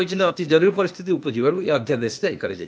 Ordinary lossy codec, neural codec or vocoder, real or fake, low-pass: none; codec, 16 kHz, 0.8 kbps, ZipCodec; fake; none